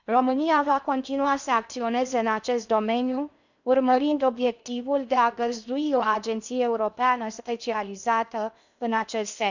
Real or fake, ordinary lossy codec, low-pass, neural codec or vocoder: fake; none; 7.2 kHz; codec, 16 kHz in and 24 kHz out, 0.8 kbps, FocalCodec, streaming, 65536 codes